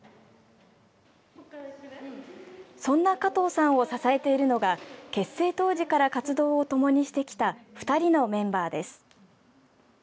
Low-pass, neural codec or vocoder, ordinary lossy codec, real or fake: none; none; none; real